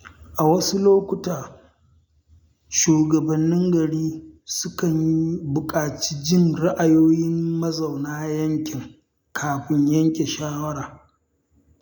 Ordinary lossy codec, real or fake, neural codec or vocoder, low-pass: none; real; none; none